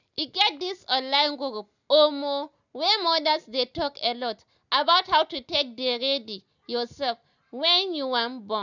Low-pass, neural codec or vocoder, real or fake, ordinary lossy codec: 7.2 kHz; none; real; none